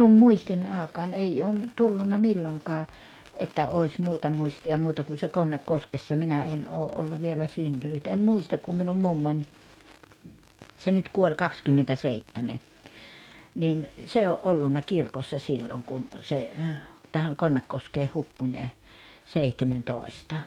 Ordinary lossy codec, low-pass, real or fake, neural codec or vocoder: none; 19.8 kHz; fake; codec, 44.1 kHz, 2.6 kbps, DAC